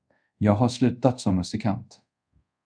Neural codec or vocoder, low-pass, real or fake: codec, 24 kHz, 0.5 kbps, DualCodec; 9.9 kHz; fake